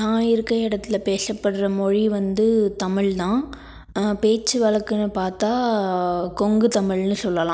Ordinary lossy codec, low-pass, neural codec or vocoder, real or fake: none; none; none; real